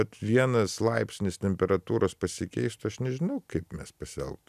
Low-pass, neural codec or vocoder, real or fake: 14.4 kHz; none; real